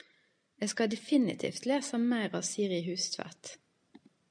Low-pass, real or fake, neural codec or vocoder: 9.9 kHz; real; none